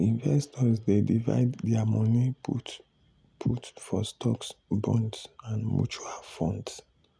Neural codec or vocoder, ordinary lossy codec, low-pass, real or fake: none; none; none; real